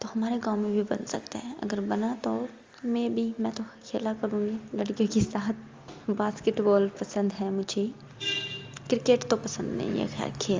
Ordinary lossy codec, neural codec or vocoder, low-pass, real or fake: Opus, 32 kbps; none; 7.2 kHz; real